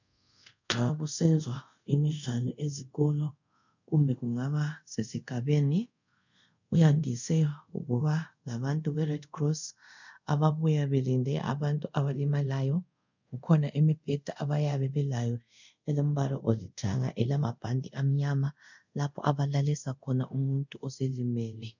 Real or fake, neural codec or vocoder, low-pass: fake; codec, 24 kHz, 0.5 kbps, DualCodec; 7.2 kHz